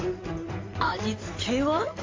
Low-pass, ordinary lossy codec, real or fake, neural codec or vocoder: 7.2 kHz; AAC, 32 kbps; fake; codec, 16 kHz in and 24 kHz out, 2.2 kbps, FireRedTTS-2 codec